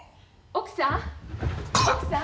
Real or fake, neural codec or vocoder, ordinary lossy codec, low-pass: real; none; none; none